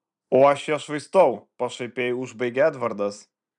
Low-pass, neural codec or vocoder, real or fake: 10.8 kHz; vocoder, 44.1 kHz, 128 mel bands every 256 samples, BigVGAN v2; fake